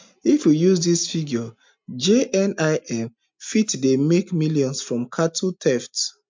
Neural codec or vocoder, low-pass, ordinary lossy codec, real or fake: none; 7.2 kHz; MP3, 64 kbps; real